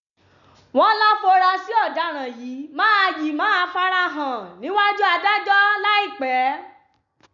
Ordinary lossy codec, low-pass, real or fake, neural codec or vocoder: none; 7.2 kHz; real; none